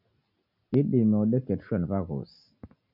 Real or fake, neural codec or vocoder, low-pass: real; none; 5.4 kHz